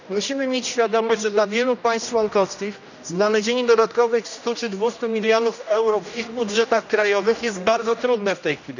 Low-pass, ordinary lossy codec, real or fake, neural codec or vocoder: 7.2 kHz; none; fake; codec, 16 kHz, 1 kbps, X-Codec, HuBERT features, trained on general audio